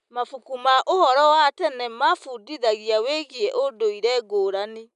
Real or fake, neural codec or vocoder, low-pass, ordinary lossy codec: real; none; 10.8 kHz; none